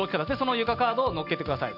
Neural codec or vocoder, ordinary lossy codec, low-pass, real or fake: none; none; 5.4 kHz; real